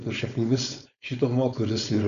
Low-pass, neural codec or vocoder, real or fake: 7.2 kHz; codec, 16 kHz, 4.8 kbps, FACodec; fake